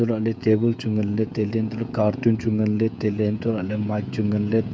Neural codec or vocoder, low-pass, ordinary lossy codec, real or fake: codec, 16 kHz, 16 kbps, FreqCodec, smaller model; none; none; fake